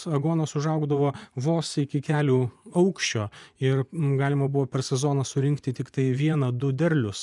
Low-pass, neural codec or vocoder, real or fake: 10.8 kHz; vocoder, 24 kHz, 100 mel bands, Vocos; fake